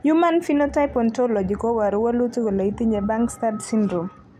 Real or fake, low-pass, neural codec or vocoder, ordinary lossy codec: real; 14.4 kHz; none; none